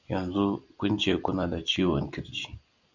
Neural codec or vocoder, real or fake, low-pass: none; real; 7.2 kHz